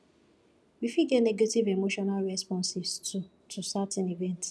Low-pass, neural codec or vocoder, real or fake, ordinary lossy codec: none; none; real; none